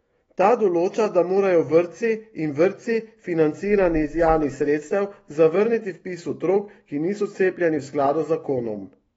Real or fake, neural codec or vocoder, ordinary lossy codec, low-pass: fake; autoencoder, 48 kHz, 128 numbers a frame, DAC-VAE, trained on Japanese speech; AAC, 24 kbps; 19.8 kHz